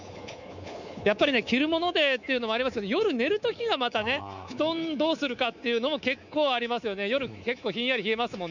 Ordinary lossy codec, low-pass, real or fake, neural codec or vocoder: none; 7.2 kHz; fake; codec, 24 kHz, 3.1 kbps, DualCodec